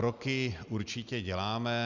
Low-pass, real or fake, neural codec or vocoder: 7.2 kHz; real; none